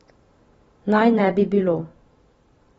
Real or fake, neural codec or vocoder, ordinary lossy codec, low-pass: fake; vocoder, 44.1 kHz, 128 mel bands every 512 samples, BigVGAN v2; AAC, 24 kbps; 19.8 kHz